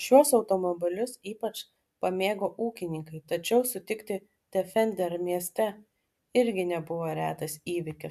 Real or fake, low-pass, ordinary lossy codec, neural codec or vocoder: real; 14.4 kHz; AAC, 96 kbps; none